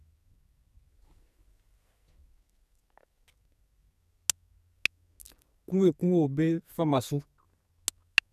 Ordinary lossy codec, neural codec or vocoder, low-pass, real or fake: none; codec, 44.1 kHz, 2.6 kbps, SNAC; 14.4 kHz; fake